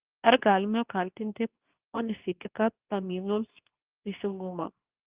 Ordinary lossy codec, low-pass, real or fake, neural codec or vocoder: Opus, 24 kbps; 3.6 kHz; fake; codec, 24 kHz, 0.9 kbps, WavTokenizer, medium speech release version 2